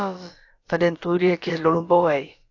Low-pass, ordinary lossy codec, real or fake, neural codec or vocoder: 7.2 kHz; AAC, 48 kbps; fake; codec, 16 kHz, about 1 kbps, DyCAST, with the encoder's durations